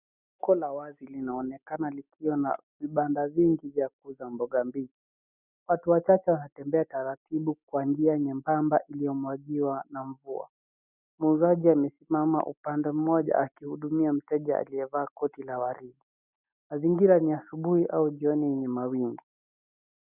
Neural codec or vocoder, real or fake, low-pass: none; real; 3.6 kHz